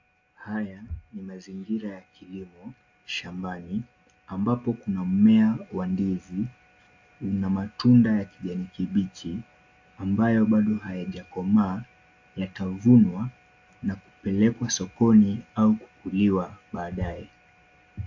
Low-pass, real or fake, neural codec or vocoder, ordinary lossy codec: 7.2 kHz; real; none; AAC, 48 kbps